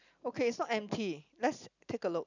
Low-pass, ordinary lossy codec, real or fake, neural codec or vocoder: 7.2 kHz; none; real; none